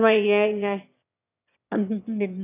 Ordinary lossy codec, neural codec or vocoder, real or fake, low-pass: none; autoencoder, 22.05 kHz, a latent of 192 numbers a frame, VITS, trained on one speaker; fake; 3.6 kHz